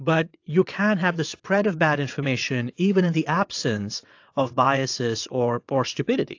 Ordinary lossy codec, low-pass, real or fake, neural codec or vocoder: AAC, 48 kbps; 7.2 kHz; fake; vocoder, 22.05 kHz, 80 mel bands, WaveNeXt